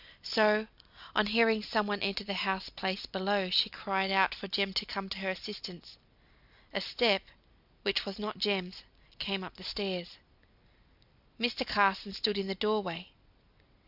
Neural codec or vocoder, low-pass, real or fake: none; 5.4 kHz; real